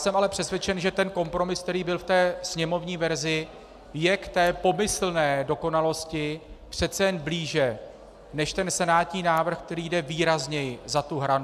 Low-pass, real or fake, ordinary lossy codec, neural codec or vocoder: 14.4 kHz; real; AAC, 96 kbps; none